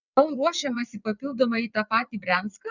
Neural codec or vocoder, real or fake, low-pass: vocoder, 22.05 kHz, 80 mel bands, WaveNeXt; fake; 7.2 kHz